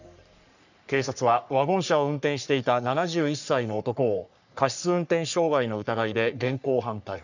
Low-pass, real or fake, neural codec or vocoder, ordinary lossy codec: 7.2 kHz; fake; codec, 44.1 kHz, 3.4 kbps, Pupu-Codec; none